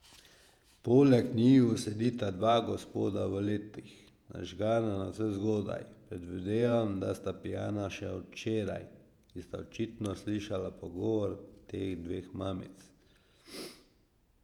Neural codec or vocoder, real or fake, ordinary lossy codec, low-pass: vocoder, 48 kHz, 128 mel bands, Vocos; fake; none; 19.8 kHz